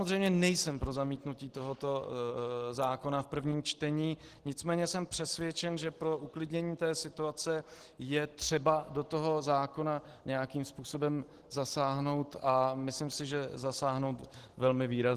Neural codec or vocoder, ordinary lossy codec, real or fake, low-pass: none; Opus, 16 kbps; real; 14.4 kHz